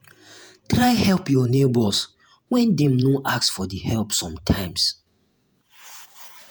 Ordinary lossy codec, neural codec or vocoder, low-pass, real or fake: none; vocoder, 48 kHz, 128 mel bands, Vocos; none; fake